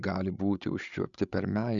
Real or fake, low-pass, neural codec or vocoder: fake; 7.2 kHz; codec, 16 kHz, 16 kbps, FreqCodec, larger model